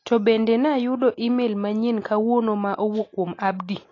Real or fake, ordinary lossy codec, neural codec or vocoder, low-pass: real; AAC, 32 kbps; none; 7.2 kHz